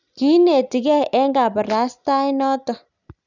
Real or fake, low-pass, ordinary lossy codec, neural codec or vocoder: real; 7.2 kHz; none; none